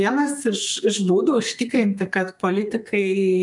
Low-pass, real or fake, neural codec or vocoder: 10.8 kHz; fake; codec, 32 kHz, 1.9 kbps, SNAC